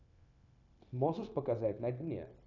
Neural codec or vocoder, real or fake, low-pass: codec, 16 kHz, 0.9 kbps, LongCat-Audio-Codec; fake; 7.2 kHz